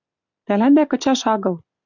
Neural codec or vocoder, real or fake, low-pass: none; real; 7.2 kHz